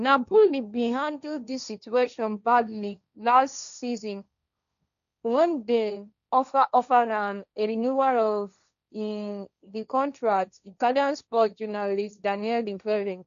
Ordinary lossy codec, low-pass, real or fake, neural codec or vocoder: none; 7.2 kHz; fake; codec, 16 kHz, 1.1 kbps, Voila-Tokenizer